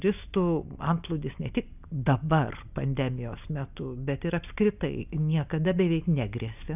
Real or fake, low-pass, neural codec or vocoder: real; 3.6 kHz; none